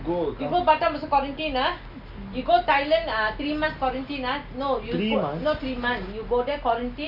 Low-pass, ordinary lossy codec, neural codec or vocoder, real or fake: 5.4 kHz; none; none; real